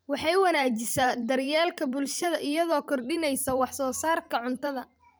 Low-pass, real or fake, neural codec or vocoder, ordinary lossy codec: none; fake; vocoder, 44.1 kHz, 128 mel bands every 512 samples, BigVGAN v2; none